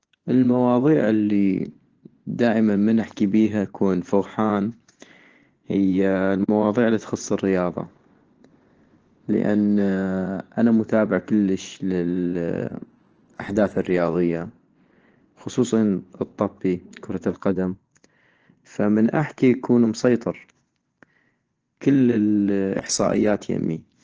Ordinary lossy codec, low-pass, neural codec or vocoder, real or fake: Opus, 16 kbps; 7.2 kHz; vocoder, 24 kHz, 100 mel bands, Vocos; fake